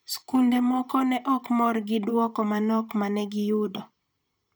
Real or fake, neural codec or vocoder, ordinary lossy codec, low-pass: fake; vocoder, 44.1 kHz, 128 mel bands, Pupu-Vocoder; none; none